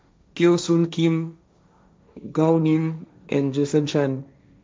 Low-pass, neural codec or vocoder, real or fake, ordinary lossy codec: none; codec, 16 kHz, 1.1 kbps, Voila-Tokenizer; fake; none